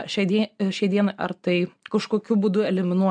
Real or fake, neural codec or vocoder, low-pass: real; none; 9.9 kHz